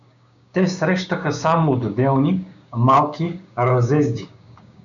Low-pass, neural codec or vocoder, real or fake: 7.2 kHz; codec, 16 kHz, 6 kbps, DAC; fake